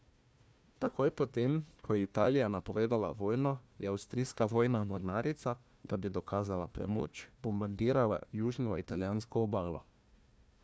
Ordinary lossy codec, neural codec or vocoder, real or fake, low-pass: none; codec, 16 kHz, 1 kbps, FunCodec, trained on Chinese and English, 50 frames a second; fake; none